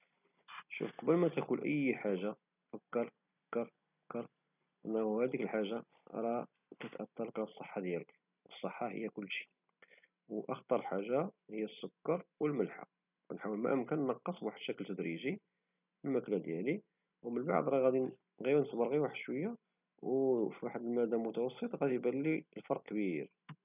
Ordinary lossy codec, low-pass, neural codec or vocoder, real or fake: none; 3.6 kHz; none; real